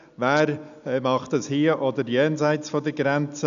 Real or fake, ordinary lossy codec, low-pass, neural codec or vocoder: real; none; 7.2 kHz; none